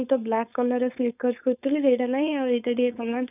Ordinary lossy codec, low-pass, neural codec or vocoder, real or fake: none; 3.6 kHz; codec, 16 kHz, 4.8 kbps, FACodec; fake